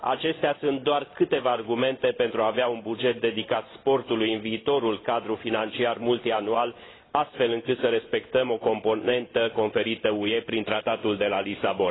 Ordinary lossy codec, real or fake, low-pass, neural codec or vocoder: AAC, 16 kbps; real; 7.2 kHz; none